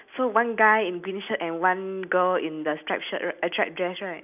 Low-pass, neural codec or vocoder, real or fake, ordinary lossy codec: 3.6 kHz; none; real; none